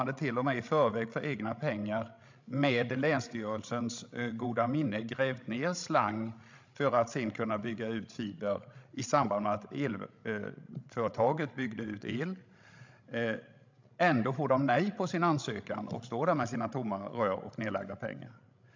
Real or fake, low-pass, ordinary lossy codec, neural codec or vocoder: fake; 7.2 kHz; MP3, 64 kbps; codec, 16 kHz, 16 kbps, FreqCodec, larger model